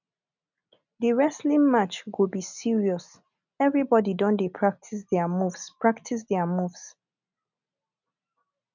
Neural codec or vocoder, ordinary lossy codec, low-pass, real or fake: none; none; 7.2 kHz; real